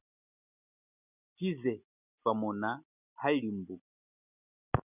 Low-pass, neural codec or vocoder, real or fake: 3.6 kHz; none; real